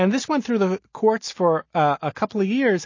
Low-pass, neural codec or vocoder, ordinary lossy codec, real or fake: 7.2 kHz; none; MP3, 32 kbps; real